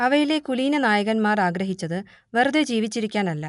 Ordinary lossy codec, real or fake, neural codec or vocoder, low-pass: none; real; none; 10.8 kHz